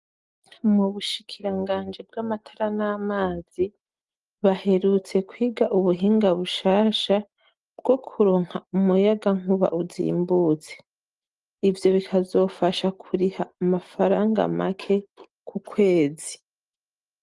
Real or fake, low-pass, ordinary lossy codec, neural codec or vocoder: real; 10.8 kHz; Opus, 24 kbps; none